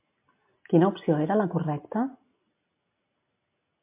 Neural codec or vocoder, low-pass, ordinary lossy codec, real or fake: none; 3.6 kHz; MP3, 32 kbps; real